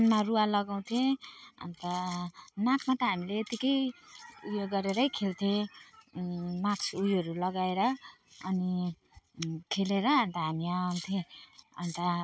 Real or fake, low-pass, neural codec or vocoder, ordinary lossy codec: real; none; none; none